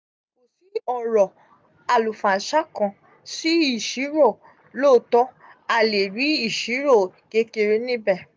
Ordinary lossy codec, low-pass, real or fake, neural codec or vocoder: none; none; real; none